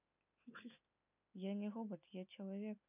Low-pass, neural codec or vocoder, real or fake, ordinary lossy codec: 3.6 kHz; none; real; none